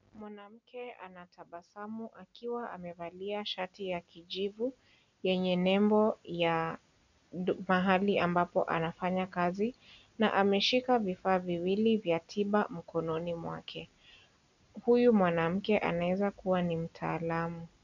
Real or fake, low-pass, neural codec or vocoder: real; 7.2 kHz; none